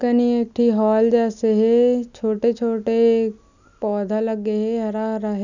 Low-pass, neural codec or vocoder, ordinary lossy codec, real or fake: 7.2 kHz; none; none; real